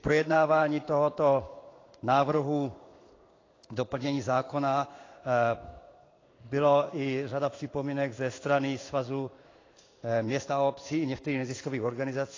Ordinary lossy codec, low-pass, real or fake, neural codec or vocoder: AAC, 32 kbps; 7.2 kHz; fake; codec, 16 kHz in and 24 kHz out, 1 kbps, XY-Tokenizer